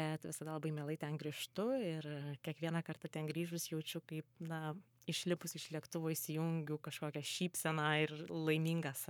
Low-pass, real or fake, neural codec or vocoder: 19.8 kHz; fake; codec, 44.1 kHz, 7.8 kbps, Pupu-Codec